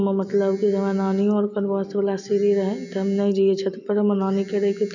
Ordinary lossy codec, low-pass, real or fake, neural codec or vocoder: none; 7.2 kHz; fake; vocoder, 44.1 kHz, 128 mel bands every 256 samples, BigVGAN v2